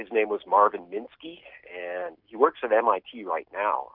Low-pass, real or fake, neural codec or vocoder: 5.4 kHz; real; none